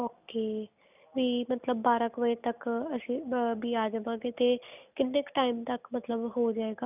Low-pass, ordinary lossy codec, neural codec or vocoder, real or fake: 3.6 kHz; none; none; real